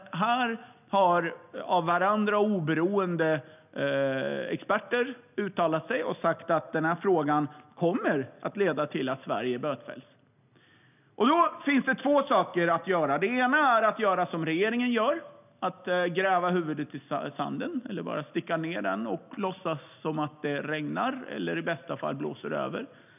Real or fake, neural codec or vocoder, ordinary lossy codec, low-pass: real; none; none; 3.6 kHz